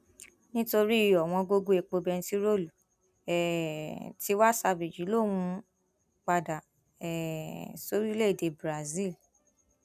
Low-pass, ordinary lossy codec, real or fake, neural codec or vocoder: 14.4 kHz; none; real; none